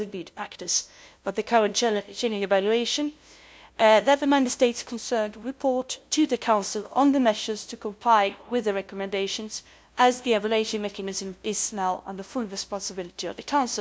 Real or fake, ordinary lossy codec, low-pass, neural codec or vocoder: fake; none; none; codec, 16 kHz, 0.5 kbps, FunCodec, trained on LibriTTS, 25 frames a second